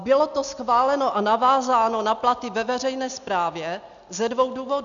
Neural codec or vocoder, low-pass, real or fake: none; 7.2 kHz; real